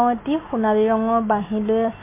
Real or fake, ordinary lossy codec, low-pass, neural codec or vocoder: real; MP3, 24 kbps; 3.6 kHz; none